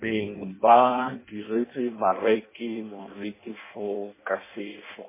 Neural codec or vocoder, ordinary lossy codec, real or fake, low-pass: codec, 16 kHz in and 24 kHz out, 0.6 kbps, FireRedTTS-2 codec; MP3, 16 kbps; fake; 3.6 kHz